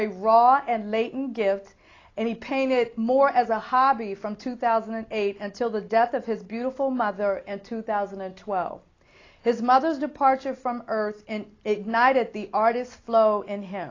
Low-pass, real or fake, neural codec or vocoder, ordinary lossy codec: 7.2 kHz; real; none; AAC, 32 kbps